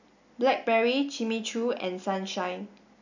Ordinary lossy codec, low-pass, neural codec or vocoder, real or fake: none; 7.2 kHz; none; real